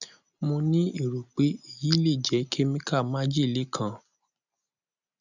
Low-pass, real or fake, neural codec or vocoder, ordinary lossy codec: 7.2 kHz; real; none; none